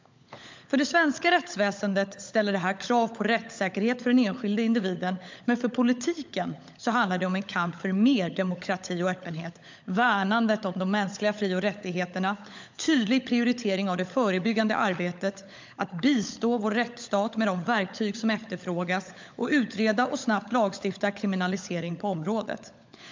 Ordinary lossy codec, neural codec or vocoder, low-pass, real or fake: MP3, 64 kbps; codec, 16 kHz, 16 kbps, FunCodec, trained on LibriTTS, 50 frames a second; 7.2 kHz; fake